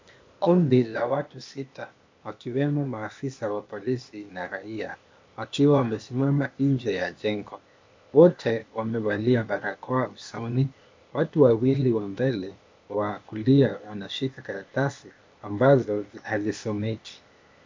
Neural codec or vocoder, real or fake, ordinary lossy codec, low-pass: codec, 16 kHz, 0.8 kbps, ZipCodec; fake; MP3, 64 kbps; 7.2 kHz